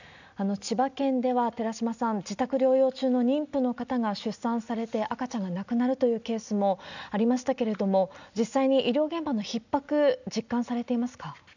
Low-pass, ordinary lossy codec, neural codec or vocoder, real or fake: 7.2 kHz; none; none; real